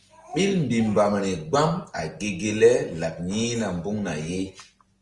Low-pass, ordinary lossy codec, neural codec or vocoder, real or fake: 10.8 kHz; Opus, 32 kbps; none; real